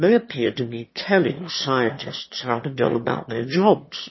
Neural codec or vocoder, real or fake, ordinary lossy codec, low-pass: autoencoder, 22.05 kHz, a latent of 192 numbers a frame, VITS, trained on one speaker; fake; MP3, 24 kbps; 7.2 kHz